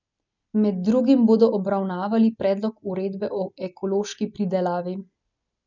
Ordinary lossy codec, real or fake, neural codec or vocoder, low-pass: none; real; none; 7.2 kHz